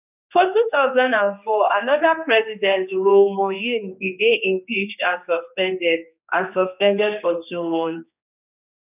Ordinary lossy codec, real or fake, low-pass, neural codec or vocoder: none; fake; 3.6 kHz; codec, 16 kHz, 2 kbps, X-Codec, HuBERT features, trained on general audio